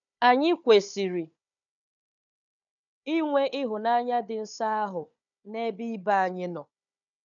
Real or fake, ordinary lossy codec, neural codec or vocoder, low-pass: fake; none; codec, 16 kHz, 4 kbps, FunCodec, trained on Chinese and English, 50 frames a second; 7.2 kHz